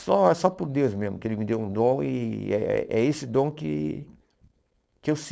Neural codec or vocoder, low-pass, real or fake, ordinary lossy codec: codec, 16 kHz, 4.8 kbps, FACodec; none; fake; none